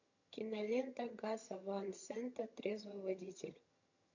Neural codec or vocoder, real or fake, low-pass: vocoder, 22.05 kHz, 80 mel bands, HiFi-GAN; fake; 7.2 kHz